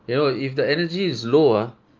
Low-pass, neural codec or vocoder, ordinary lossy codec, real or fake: 7.2 kHz; none; Opus, 24 kbps; real